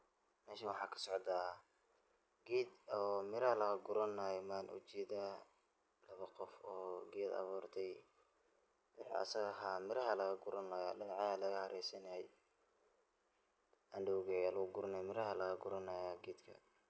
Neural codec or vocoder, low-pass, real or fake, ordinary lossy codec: none; none; real; none